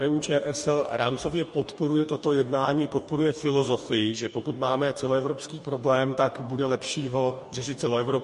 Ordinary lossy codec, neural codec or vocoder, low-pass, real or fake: MP3, 48 kbps; codec, 44.1 kHz, 2.6 kbps, DAC; 14.4 kHz; fake